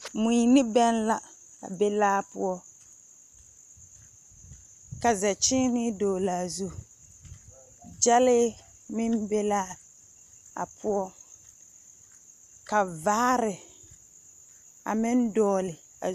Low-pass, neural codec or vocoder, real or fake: 14.4 kHz; none; real